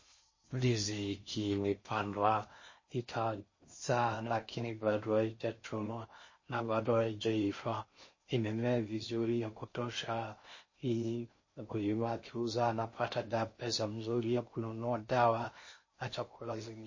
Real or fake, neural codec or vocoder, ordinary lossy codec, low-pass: fake; codec, 16 kHz in and 24 kHz out, 0.6 kbps, FocalCodec, streaming, 4096 codes; MP3, 32 kbps; 7.2 kHz